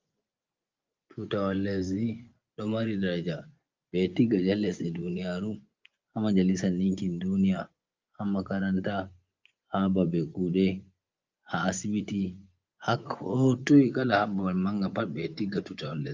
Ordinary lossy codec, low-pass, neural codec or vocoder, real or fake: Opus, 24 kbps; 7.2 kHz; vocoder, 24 kHz, 100 mel bands, Vocos; fake